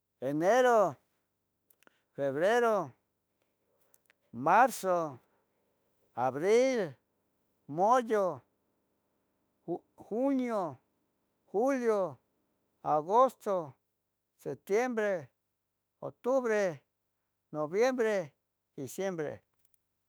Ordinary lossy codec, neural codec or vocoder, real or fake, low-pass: none; autoencoder, 48 kHz, 32 numbers a frame, DAC-VAE, trained on Japanese speech; fake; none